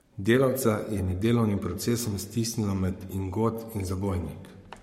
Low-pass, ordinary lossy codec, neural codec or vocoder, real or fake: 19.8 kHz; MP3, 64 kbps; codec, 44.1 kHz, 7.8 kbps, Pupu-Codec; fake